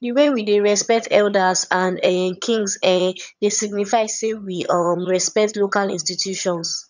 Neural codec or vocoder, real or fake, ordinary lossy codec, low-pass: vocoder, 22.05 kHz, 80 mel bands, HiFi-GAN; fake; none; 7.2 kHz